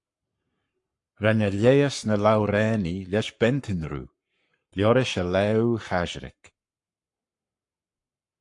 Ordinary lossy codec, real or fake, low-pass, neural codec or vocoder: AAC, 64 kbps; fake; 10.8 kHz; codec, 44.1 kHz, 7.8 kbps, Pupu-Codec